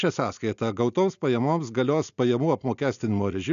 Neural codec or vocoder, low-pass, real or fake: none; 7.2 kHz; real